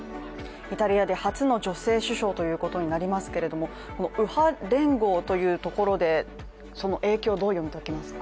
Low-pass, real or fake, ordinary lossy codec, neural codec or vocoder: none; real; none; none